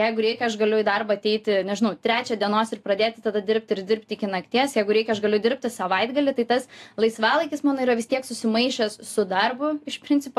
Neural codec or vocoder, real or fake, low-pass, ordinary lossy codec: none; real; 14.4 kHz; AAC, 64 kbps